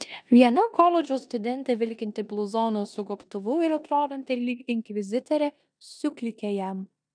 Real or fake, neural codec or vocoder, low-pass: fake; codec, 16 kHz in and 24 kHz out, 0.9 kbps, LongCat-Audio-Codec, four codebook decoder; 9.9 kHz